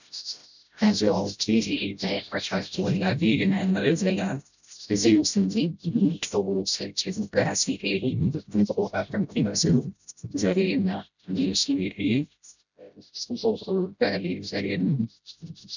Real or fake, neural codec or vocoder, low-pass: fake; codec, 16 kHz, 0.5 kbps, FreqCodec, smaller model; 7.2 kHz